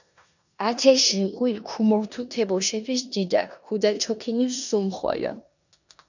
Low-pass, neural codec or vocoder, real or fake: 7.2 kHz; codec, 16 kHz in and 24 kHz out, 0.9 kbps, LongCat-Audio-Codec, four codebook decoder; fake